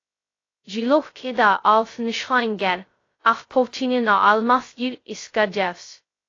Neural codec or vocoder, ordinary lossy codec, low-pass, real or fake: codec, 16 kHz, 0.2 kbps, FocalCodec; AAC, 32 kbps; 7.2 kHz; fake